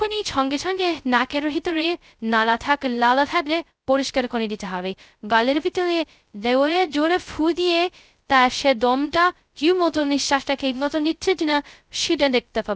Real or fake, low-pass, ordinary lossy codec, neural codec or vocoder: fake; none; none; codec, 16 kHz, 0.2 kbps, FocalCodec